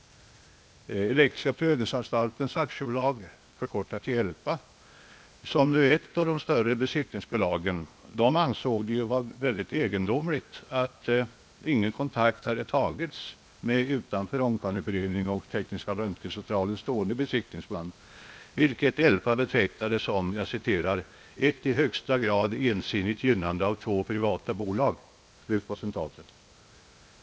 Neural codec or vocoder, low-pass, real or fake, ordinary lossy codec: codec, 16 kHz, 0.8 kbps, ZipCodec; none; fake; none